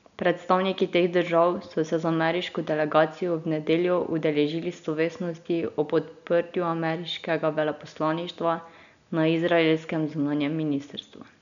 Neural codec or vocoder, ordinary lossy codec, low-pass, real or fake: none; none; 7.2 kHz; real